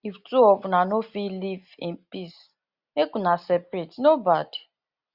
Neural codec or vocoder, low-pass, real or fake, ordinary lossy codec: none; 5.4 kHz; real; Opus, 64 kbps